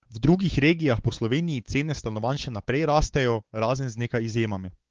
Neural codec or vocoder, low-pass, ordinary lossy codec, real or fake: codec, 16 kHz, 4 kbps, X-Codec, WavLM features, trained on Multilingual LibriSpeech; 7.2 kHz; Opus, 16 kbps; fake